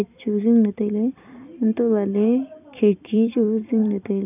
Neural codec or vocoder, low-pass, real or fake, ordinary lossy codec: vocoder, 44.1 kHz, 128 mel bands every 256 samples, BigVGAN v2; 3.6 kHz; fake; none